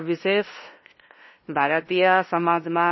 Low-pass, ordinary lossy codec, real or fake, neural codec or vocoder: 7.2 kHz; MP3, 24 kbps; fake; codec, 16 kHz, 0.5 kbps, FunCodec, trained on LibriTTS, 25 frames a second